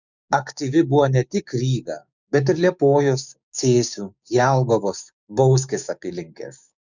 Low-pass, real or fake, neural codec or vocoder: 7.2 kHz; fake; codec, 16 kHz, 6 kbps, DAC